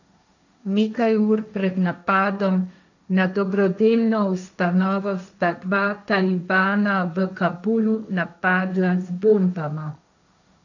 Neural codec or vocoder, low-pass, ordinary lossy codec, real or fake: codec, 16 kHz, 1.1 kbps, Voila-Tokenizer; 7.2 kHz; none; fake